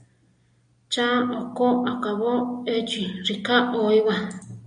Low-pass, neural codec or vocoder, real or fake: 9.9 kHz; none; real